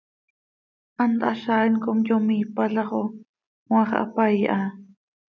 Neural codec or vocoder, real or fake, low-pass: none; real; 7.2 kHz